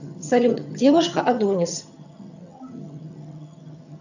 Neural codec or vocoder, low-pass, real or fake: vocoder, 22.05 kHz, 80 mel bands, HiFi-GAN; 7.2 kHz; fake